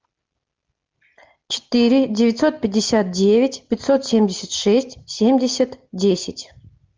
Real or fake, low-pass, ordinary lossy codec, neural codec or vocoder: real; 7.2 kHz; Opus, 24 kbps; none